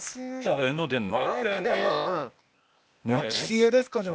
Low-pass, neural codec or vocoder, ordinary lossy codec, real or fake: none; codec, 16 kHz, 0.8 kbps, ZipCodec; none; fake